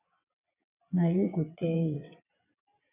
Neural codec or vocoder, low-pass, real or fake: vocoder, 22.05 kHz, 80 mel bands, WaveNeXt; 3.6 kHz; fake